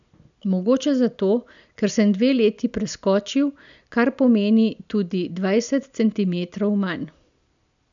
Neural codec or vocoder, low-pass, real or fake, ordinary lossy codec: none; 7.2 kHz; real; none